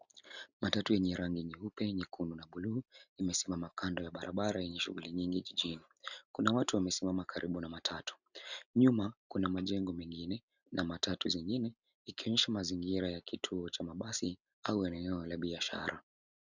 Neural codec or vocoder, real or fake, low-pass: none; real; 7.2 kHz